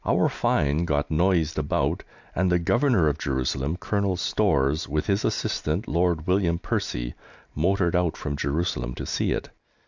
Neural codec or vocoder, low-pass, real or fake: none; 7.2 kHz; real